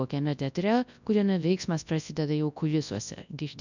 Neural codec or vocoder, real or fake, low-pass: codec, 24 kHz, 0.9 kbps, WavTokenizer, large speech release; fake; 7.2 kHz